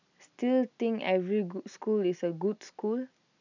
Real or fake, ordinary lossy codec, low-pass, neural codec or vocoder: real; none; 7.2 kHz; none